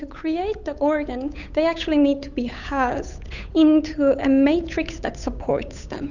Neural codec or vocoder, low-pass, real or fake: codec, 16 kHz, 8 kbps, FunCodec, trained on Chinese and English, 25 frames a second; 7.2 kHz; fake